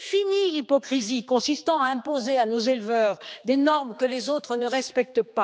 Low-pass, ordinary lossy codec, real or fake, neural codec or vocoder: none; none; fake; codec, 16 kHz, 2 kbps, X-Codec, HuBERT features, trained on general audio